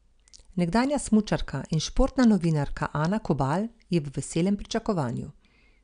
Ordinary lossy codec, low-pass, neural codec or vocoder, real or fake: none; 9.9 kHz; none; real